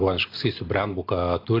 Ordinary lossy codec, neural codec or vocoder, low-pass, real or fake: AAC, 24 kbps; none; 5.4 kHz; real